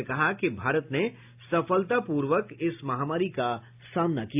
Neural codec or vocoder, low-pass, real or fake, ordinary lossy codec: none; 3.6 kHz; real; AAC, 32 kbps